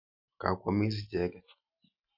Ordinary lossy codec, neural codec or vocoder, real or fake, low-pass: Opus, 64 kbps; none; real; 5.4 kHz